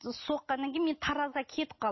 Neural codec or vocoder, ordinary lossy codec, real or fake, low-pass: none; MP3, 24 kbps; real; 7.2 kHz